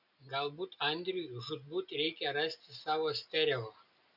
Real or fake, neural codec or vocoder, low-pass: real; none; 5.4 kHz